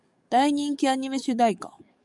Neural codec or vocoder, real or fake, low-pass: codec, 44.1 kHz, 7.8 kbps, DAC; fake; 10.8 kHz